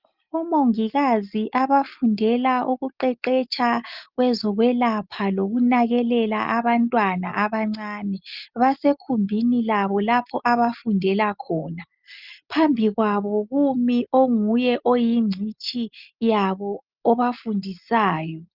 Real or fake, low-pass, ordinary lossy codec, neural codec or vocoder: real; 5.4 kHz; Opus, 24 kbps; none